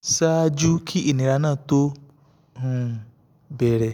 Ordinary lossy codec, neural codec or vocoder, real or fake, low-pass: none; none; real; 19.8 kHz